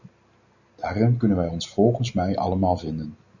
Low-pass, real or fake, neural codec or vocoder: 7.2 kHz; real; none